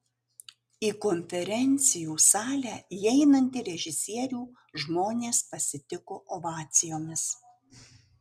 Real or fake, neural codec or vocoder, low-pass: real; none; 14.4 kHz